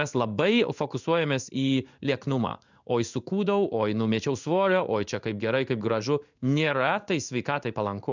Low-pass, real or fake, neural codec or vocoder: 7.2 kHz; fake; codec, 16 kHz in and 24 kHz out, 1 kbps, XY-Tokenizer